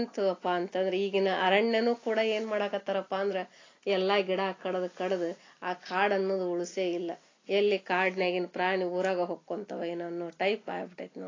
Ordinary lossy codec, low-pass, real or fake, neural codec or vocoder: AAC, 32 kbps; 7.2 kHz; real; none